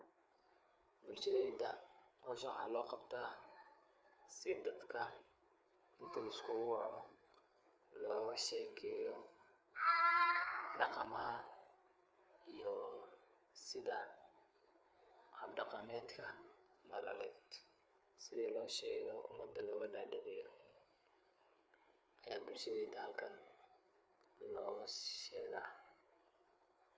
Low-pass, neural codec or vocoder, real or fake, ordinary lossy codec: none; codec, 16 kHz, 4 kbps, FreqCodec, larger model; fake; none